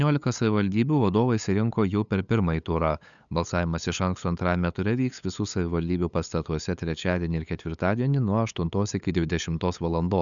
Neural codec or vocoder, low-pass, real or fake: codec, 16 kHz, 8 kbps, FunCodec, trained on LibriTTS, 25 frames a second; 7.2 kHz; fake